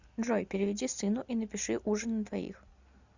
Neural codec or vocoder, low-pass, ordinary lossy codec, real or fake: none; 7.2 kHz; Opus, 64 kbps; real